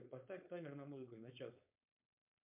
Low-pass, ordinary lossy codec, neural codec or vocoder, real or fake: 3.6 kHz; MP3, 32 kbps; codec, 16 kHz, 4.8 kbps, FACodec; fake